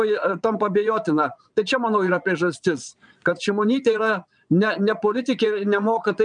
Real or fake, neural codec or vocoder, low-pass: fake; vocoder, 22.05 kHz, 80 mel bands, Vocos; 9.9 kHz